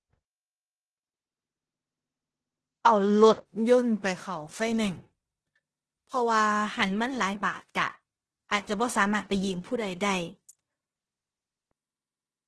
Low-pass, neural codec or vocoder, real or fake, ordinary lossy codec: 10.8 kHz; codec, 16 kHz in and 24 kHz out, 0.9 kbps, LongCat-Audio-Codec, four codebook decoder; fake; Opus, 16 kbps